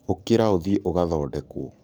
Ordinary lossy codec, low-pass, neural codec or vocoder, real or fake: none; none; codec, 44.1 kHz, 7.8 kbps, DAC; fake